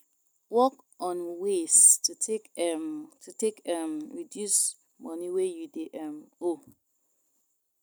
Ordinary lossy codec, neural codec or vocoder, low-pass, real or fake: none; none; none; real